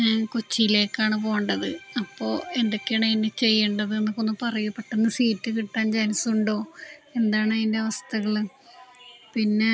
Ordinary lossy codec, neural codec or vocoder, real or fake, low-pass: none; none; real; none